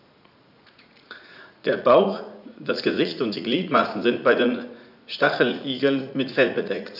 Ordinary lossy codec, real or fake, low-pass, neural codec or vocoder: none; fake; 5.4 kHz; vocoder, 44.1 kHz, 80 mel bands, Vocos